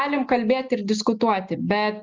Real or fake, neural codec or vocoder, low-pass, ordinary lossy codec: real; none; 7.2 kHz; Opus, 24 kbps